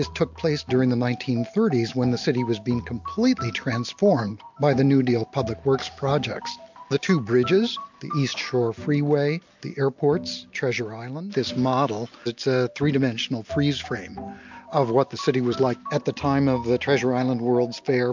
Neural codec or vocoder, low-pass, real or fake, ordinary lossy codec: none; 7.2 kHz; real; MP3, 64 kbps